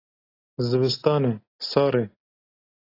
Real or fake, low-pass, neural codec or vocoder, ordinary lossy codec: real; 5.4 kHz; none; AAC, 24 kbps